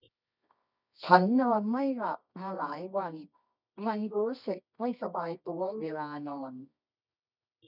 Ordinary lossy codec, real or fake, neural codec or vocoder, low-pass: none; fake; codec, 24 kHz, 0.9 kbps, WavTokenizer, medium music audio release; 5.4 kHz